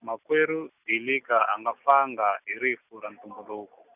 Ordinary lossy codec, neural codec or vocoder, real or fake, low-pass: none; none; real; 3.6 kHz